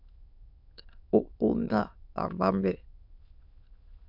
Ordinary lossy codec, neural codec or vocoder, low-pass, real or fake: AAC, 48 kbps; autoencoder, 22.05 kHz, a latent of 192 numbers a frame, VITS, trained on many speakers; 5.4 kHz; fake